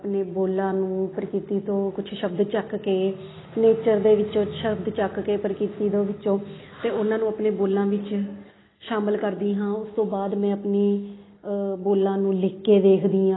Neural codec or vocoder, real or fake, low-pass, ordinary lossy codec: none; real; 7.2 kHz; AAC, 16 kbps